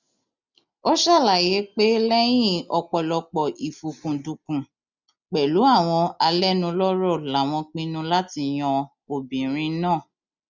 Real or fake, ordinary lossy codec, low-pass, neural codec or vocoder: real; none; 7.2 kHz; none